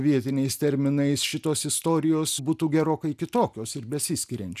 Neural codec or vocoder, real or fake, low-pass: none; real; 14.4 kHz